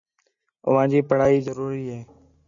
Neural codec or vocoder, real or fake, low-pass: none; real; 7.2 kHz